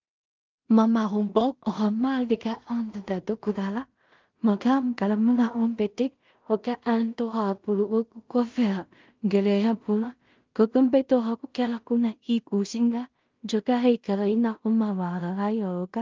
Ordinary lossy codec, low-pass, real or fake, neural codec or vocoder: Opus, 24 kbps; 7.2 kHz; fake; codec, 16 kHz in and 24 kHz out, 0.4 kbps, LongCat-Audio-Codec, two codebook decoder